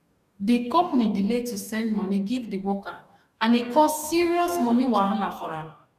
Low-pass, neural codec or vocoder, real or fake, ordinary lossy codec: 14.4 kHz; codec, 44.1 kHz, 2.6 kbps, DAC; fake; none